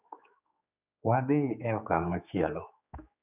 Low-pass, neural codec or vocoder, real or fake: 3.6 kHz; codec, 16 kHz, 4 kbps, X-Codec, HuBERT features, trained on general audio; fake